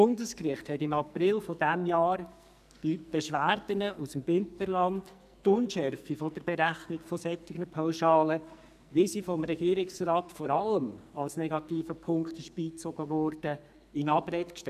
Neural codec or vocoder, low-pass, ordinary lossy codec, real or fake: codec, 44.1 kHz, 2.6 kbps, SNAC; 14.4 kHz; none; fake